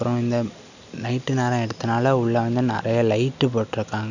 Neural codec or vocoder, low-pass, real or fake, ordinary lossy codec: none; 7.2 kHz; real; none